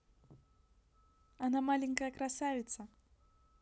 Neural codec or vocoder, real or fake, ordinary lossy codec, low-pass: none; real; none; none